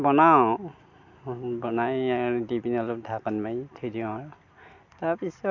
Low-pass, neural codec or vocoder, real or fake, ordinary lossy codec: 7.2 kHz; none; real; none